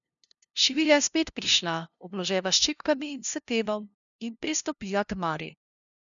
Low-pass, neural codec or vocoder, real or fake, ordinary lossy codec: 7.2 kHz; codec, 16 kHz, 0.5 kbps, FunCodec, trained on LibriTTS, 25 frames a second; fake; none